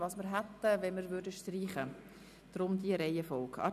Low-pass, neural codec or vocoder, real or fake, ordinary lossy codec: 14.4 kHz; none; real; none